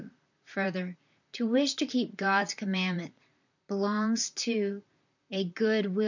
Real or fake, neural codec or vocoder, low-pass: fake; vocoder, 44.1 kHz, 128 mel bands, Pupu-Vocoder; 7.2 kHz